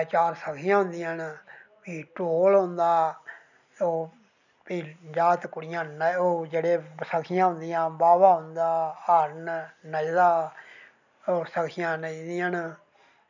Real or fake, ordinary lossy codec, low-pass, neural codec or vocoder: real; none; 7.2 kHz; none